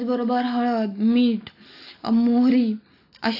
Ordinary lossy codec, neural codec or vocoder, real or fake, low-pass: AAC, 32 kbps; none; real; 5.4 kHz